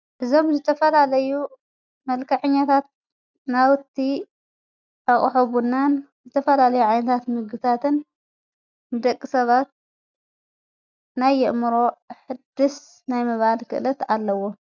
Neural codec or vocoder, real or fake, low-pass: none; real; 7.2 kHz